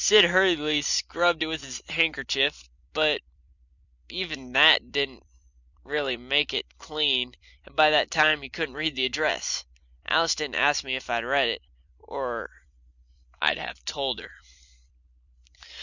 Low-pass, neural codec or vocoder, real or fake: 7.2 kHz; vocoder, 44.1 kHz, 128 mel bands every 256 samples, BigVGAN v2; fake